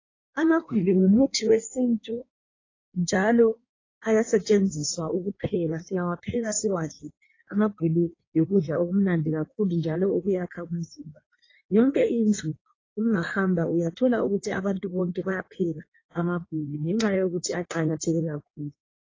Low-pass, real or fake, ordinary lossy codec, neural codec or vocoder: 7.2 kHz; fake; AAC, 32 kbps; codec, 16 kHz in and 24 kHz out, 1.1 kbps, FireRedTTS-2 codec